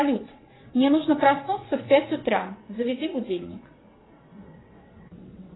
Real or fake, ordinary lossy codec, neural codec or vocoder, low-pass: fake; AAC, 16 kbps; vocoder, 22.05 kHz, 80 mel bands, WaveNeXt; 7.2 kHz